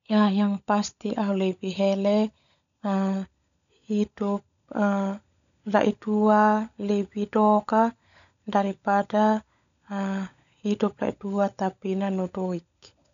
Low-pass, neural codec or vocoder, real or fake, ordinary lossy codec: 7.2 kHz; codec, 16 kHz, 16 kbps, FunCodec, trained on LibriTTS, 50 frames a second; fake; none